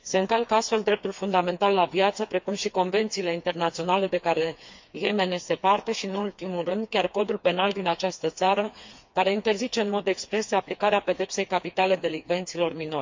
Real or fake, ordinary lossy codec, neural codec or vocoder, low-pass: fake; MP3, 48 kbps; codec, 16 kHz, 4 kbps, FreqCodec, smaller model; 7.2 kHz